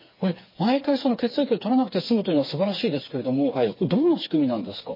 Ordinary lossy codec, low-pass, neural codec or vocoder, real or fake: MP3, 24 kbps; 5.4 kHz; codec, 16 kHz, 4 kbps, FreqCodec, smaller model; fake